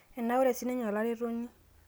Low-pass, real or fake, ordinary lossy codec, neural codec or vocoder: none; real; none; none